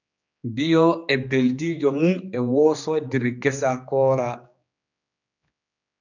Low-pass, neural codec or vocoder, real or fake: 7.2 kHz; codec, 16 kHz, 2 kbps, X-Codec, HuBERT features, trained on general audio; fake